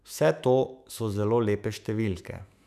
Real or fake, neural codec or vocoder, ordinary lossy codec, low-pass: fake; autoencoder, 48 kHz, 128 numbers a frame, DAC-VAE, trained on Japanese speech; none; 14.4 kHz